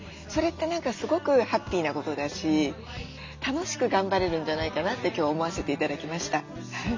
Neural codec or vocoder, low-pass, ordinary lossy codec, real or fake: none; 7.2 kHz; MP3, 64 kbps; real